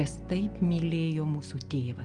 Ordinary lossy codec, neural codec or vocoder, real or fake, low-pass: Opus, 24 kbps; none; real; 9.9 kHz